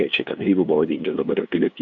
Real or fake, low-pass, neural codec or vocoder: fake; 7.2 kHz; codec, 16 kHz, 2 kbps, FunCodec, trained on LibriTTS, 25 frames a second